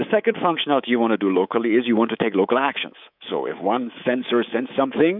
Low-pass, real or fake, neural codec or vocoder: 5.4 kHz; fake; codec, 24 kHz, 3.1 kbps, DualCodec